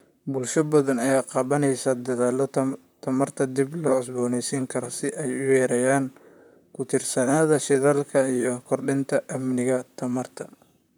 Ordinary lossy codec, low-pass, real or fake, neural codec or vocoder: none; none; fake; vocoder, 44.1 kHz, 128 mel bands, Pupu-Vocoder